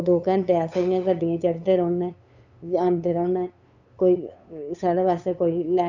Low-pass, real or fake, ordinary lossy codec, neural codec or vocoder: 7.2 kHz; fake; none; codec, 16 kHz, 8 kbps, FunCodec, trained on LibriTTS, 25 frames a second